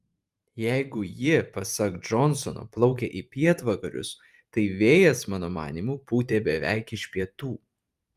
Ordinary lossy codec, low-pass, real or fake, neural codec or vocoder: Opus, 32 kbps; 14.4 kHz; fake; vocoder, 44.1 kHz, 128 mel bands every 512 samples, BigVGAN v2